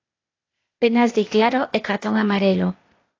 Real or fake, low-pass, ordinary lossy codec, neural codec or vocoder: fake; 7.2 kHz; AAC, 32 kbps; codec, 16 kHz, 0.8 kbps, ZipCodec